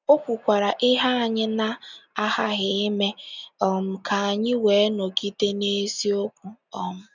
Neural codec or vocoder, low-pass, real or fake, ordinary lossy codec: none; 7.2 kHz; real; none